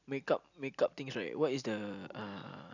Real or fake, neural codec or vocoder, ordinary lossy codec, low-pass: real; none; none; 7.2 kHz